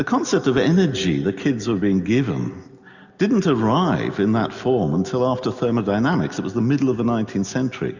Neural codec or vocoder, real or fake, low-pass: none; real; 7.2 kHz